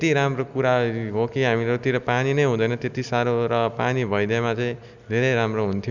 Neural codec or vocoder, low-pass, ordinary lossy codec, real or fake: none; 7.2 kHz; none; real